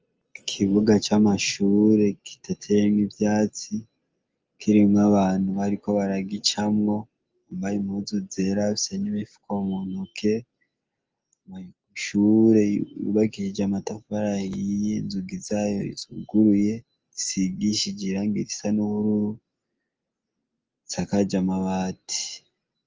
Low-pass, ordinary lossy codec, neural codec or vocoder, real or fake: 7.2 kHz; Opus, 24 kbps; none; real